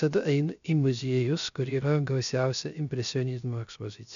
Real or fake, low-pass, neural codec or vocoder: fake; 7.2 kHz; codec, 16 kHz, 0.3 kbps, FocalCodec